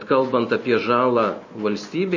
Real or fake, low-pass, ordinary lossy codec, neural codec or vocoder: real; 7.2 kHz; MP3, 32 kbps; none